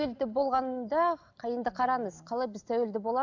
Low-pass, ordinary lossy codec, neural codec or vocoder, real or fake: 7.2 kHz; none; none; real